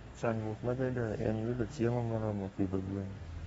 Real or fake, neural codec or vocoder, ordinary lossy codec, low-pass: fake; codec, 32 kHz, 1.9 kbps, SNAC; AAC, 24 kbps; 14.4 kHz